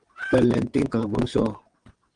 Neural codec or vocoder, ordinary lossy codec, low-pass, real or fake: vocoder, 22.05 kHz, 80 mel bands, WaveNeXt; Opus, 24 kbps; 9.9 kHz; fake